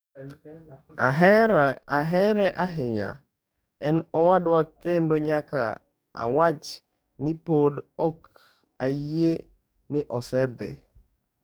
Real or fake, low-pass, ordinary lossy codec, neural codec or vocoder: fake; none; none; codec, 44.1 kHz, 2.6 kbps, DAC